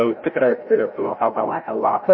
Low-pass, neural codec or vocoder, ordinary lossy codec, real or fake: 7.2 kHz; codec, 16 kHz, 0.5 kbps, FreqCodec, larger model; MP3, 24 kbps; fake